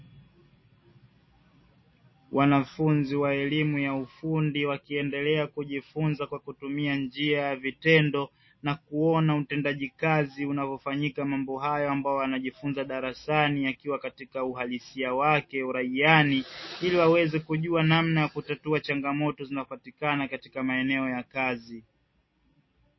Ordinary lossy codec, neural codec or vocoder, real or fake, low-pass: MP3, 24 kbps; none; real; 7.2 kHz